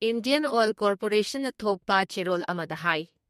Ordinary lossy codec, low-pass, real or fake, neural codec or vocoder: MP3, 64 kbps; 14.4 kHz; fake; codec, 32 kHz, 1.9 kbps, SNAC